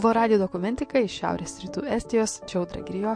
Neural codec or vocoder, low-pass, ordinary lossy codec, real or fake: none; 9.9 kHz; MP3, 48 kbps; real